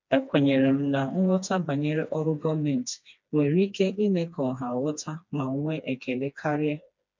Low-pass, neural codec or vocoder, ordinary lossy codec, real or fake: 7.2 kHz; codec, 16 kHz, 2 kbps, FreqCodec, smaller model; MP3, 64 kbps; fake